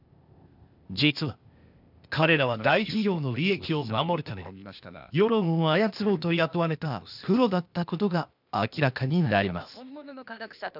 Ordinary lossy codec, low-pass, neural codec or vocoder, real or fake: none; 5.4 kHz; codec, 16 kHz, 0.8 kbps, ZipCodec; fake